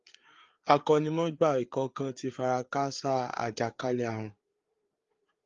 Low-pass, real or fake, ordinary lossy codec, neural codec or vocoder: 7.2 kHz; fake; Opus, 32 kbps; codec, 16 kHz, 4 kbps, FreqCodec, larger model